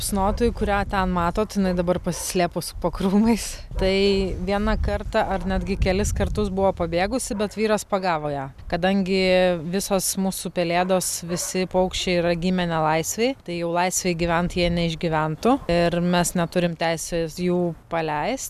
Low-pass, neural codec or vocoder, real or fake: 14.4 kHz; none; real